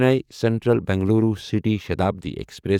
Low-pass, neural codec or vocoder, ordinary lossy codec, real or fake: 19.8 kHz; codec, 44.1 kHz, 7.8 kbps, DAC; none; fake